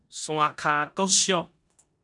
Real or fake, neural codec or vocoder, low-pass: fake; codec, 16 kHz in and 24 kHz out, 0.9 kbps, LongCat-Audio-Codec, four codebook decoder; 10.8 kHz